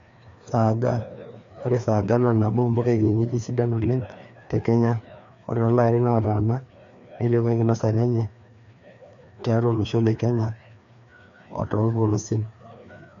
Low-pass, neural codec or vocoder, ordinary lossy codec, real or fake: 7.2 kHz; codec, 16 kHz, 2 kbps, FreqCodec, larger model; MP3, 64 kbps; fake